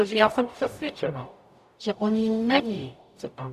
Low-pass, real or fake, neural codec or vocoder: 14.4 kHz; fake; codec, 44.1 kHz, 0.9 kbps, DAC